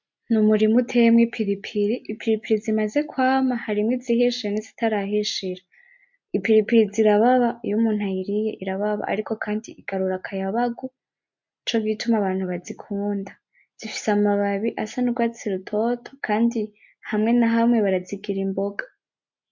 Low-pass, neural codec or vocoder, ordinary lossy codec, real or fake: 7.2 kHz; none; MP3, 48 kbps; real